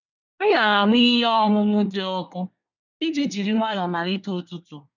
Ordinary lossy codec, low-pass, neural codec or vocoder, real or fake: none; 7.2 kHz; codec, 24 kHz, 1 kbps, SNAC; fake